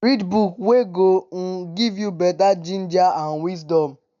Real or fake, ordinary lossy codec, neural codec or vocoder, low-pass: real; MP3, 64 kbps; none; 7.2 kHz